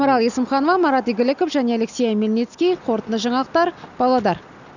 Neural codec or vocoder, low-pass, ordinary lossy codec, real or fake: none; 7.2 kHz; none; real